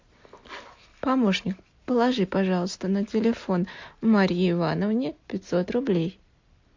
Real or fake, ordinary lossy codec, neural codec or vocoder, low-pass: real; MP3, 48 kbps; none; 7.2 kHz